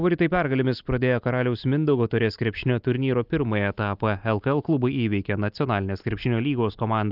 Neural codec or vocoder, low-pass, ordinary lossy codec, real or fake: none; 5.4 kHz; Opus, 24 kbps; real